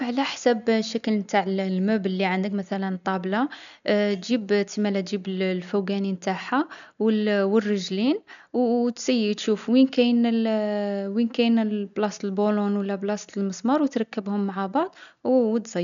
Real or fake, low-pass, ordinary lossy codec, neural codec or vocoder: real; 7.2 kHz; none; none